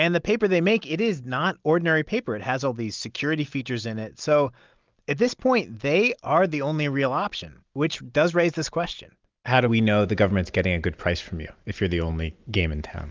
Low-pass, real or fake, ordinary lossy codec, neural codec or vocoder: 7.2 kHz; real; Opus, 32 kbps; none